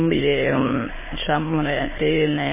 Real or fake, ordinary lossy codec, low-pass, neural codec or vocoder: fake; MP3, 16 kbps; 3.6 kHz; autoencoder, 22.05 kHz, a latent of 192 numbers a frame, VITS, trained on many speakers